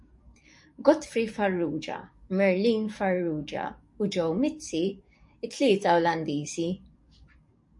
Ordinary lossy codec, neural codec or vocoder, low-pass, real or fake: MP3, 48 kbps; codec, 44.1 kHz, 7.8 kbps, DAC; 10.8 kHz; fake